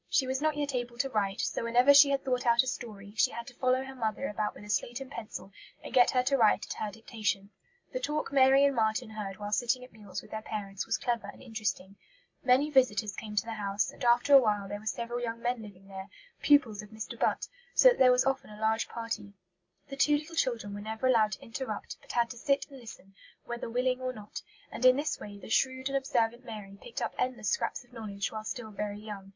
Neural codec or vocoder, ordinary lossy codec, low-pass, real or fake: none; MP3, 64 kbps; 7.2 kHz; real